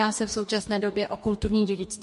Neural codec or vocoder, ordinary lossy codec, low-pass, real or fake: codec, 44.1 kHz, 2.6 kbps, DAC; MP3, 48 kbps; 14.4 kHz; fake